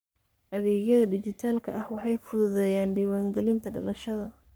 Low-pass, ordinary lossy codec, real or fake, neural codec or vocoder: none; none; fake; codec, 44.1 kHz, 3.4 kbps, Pupu-Codec